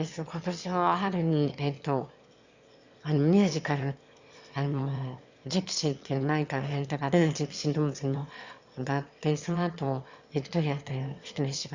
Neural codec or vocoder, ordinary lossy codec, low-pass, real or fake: autoencoder, 22.05 kHz, a latent of 192 numbers a frame, VITS, trained on one speaker; Opus, 64 kbps; 7.2 kHz; fake